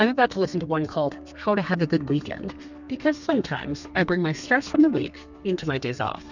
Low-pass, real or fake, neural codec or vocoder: 7.2 kHz; fake; codec, 32 kHz, 1.9 kbps, SNAC